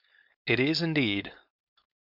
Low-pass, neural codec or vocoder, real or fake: 5.4 kHz; codec, 16 kHz, 4.8 kbps, FACodec; fake